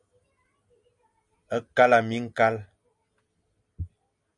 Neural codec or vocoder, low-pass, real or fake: none; 10.8 kHz; real